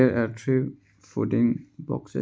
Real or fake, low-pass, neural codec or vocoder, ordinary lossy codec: real; none; none; none